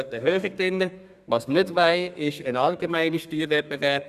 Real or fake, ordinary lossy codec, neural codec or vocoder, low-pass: fake; none; codec, 32 kHz, 1.9 kbps, SNAC; 14.4 kHz